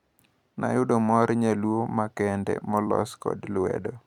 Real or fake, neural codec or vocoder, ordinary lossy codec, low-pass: fake; vocoder, 44.1 kHz, 128 mel bands every 256 samples, BigVGAN v2; none; 19.8 kHz